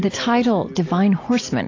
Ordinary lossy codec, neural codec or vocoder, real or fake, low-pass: AAC, 32 kbps; none; real; 7.2 kHz